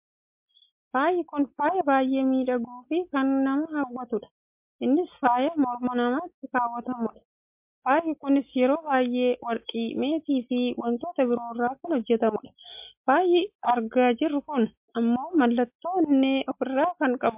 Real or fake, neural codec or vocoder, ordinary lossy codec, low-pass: real; none; MP3, 32 kbps; 3.6 kHz